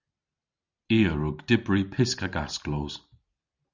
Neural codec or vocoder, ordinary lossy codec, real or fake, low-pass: none; Opus, 64 kbps; real; 7.2 kHz